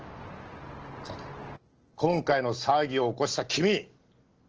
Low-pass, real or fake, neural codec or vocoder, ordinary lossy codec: 7.2 kHz; real; none; Opus, 16 kbps